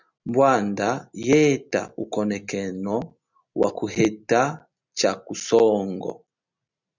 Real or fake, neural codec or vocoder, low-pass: real; none; 7.2 kHz